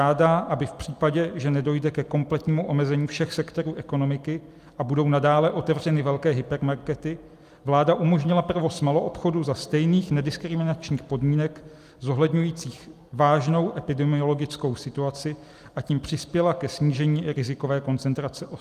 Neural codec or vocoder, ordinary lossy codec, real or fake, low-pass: none; Opus, 32 kbps; real; 14.4 kHz